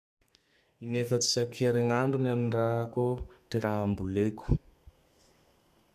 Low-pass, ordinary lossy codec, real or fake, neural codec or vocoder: 14.4 kHz; none; fake; codec, 32 kHz, 1.9 kbps, SNAC